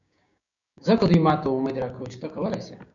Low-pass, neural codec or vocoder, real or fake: 7.2 kHz; codec, 16 kHz, 6 kbps, DAC; fake